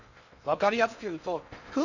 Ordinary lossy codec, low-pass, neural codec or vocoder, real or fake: none; 7.2 kHz; codec, 16 kHz in and 24 kHz out, 0.6 kbps, FocalCodec, streaming, 2048 codes; fake